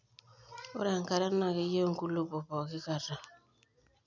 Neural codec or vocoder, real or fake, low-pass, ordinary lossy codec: none; real; 7.2 kHz; none